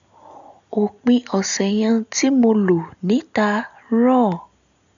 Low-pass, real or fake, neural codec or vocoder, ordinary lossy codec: 7.2 kHz; real; none; none